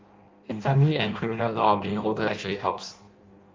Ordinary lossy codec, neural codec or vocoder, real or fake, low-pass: Opus, 24 kbps; codec, 16 kHz in and 24 kHz out, 0.6 kbps, FireRedTTS-2 codec; fake; 7.2 kHz